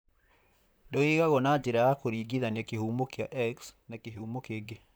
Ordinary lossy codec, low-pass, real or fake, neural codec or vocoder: none; none; fake; vocoder, 44.1 kHz, 128 mel bands, Pupu-Vocoder